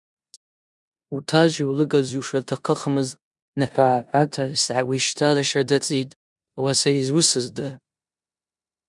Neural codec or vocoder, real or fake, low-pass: codec, 16 kHz in and 24 kHz out, 0.9 kbps, LongCat-Audio-Codec, four codebook decoder; fake; 10.8 kHz